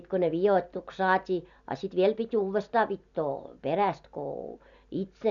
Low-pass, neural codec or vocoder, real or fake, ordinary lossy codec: 7.2 kHz; none; real; none